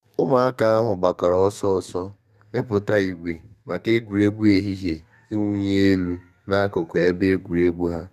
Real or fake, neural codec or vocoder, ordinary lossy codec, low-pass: fake; codec, 32 kHz, 1.9 kbps, SNAC; none; 14.4 kHz